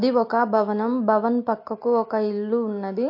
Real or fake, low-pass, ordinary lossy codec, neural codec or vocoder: real; 5.4 kHz; MP3, 32 kbps; none